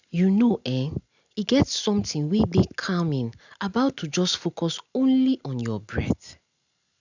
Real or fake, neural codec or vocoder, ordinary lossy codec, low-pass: real; none; none; 7.2 kHz